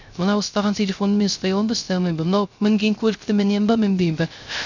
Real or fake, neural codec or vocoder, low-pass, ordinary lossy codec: fake; codec, 16 kHz, 0.3 kbps, FocalCodec; 7.2 kHz; none